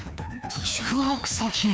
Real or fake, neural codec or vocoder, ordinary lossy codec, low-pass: fake; codec, 16 kHz, 2 kbps, FreqCodec, larger model; none; none